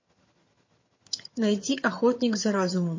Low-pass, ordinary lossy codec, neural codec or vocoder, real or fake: 7.2 kHz; MP3, 32 kbps; vocoder, 22.05 kHz, 80 mel bands, HiFi-GAN; fake